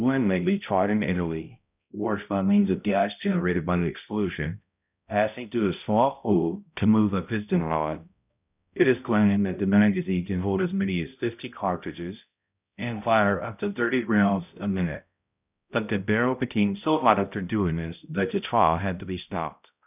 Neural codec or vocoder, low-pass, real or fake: codec, 16 kHz, 0.5 kbps, X-Codec, HuBERT features, trained on balanced general audio; 3.6 kHz; fake